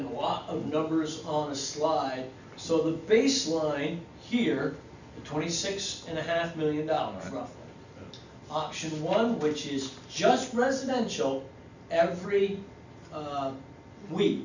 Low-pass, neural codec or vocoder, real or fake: 7.2 kHz; none; real